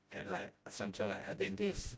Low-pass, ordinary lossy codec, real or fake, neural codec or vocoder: none; none; fake; codec, 16 kHz, 0.5 kbps, FreqCodec, smaller model